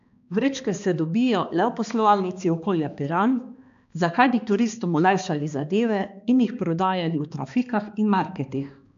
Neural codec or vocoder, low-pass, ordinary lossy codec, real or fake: codec, 16 kHz, 2 kbps, X-Codec, HuBERT features, trained on balanced general audio; 7.2 kHz; AAC, 64 kbps; fake